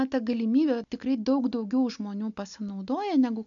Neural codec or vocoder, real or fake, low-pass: none; real; 7.2 kHz